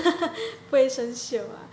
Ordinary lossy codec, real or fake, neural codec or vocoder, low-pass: none; real; none; none